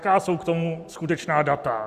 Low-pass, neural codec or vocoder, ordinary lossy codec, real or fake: 14.4 kHz; none; Opus, 64 kbps; real